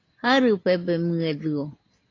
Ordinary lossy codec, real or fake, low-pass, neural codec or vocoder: AAC, 32 kbps; real; 7.2 kHz; none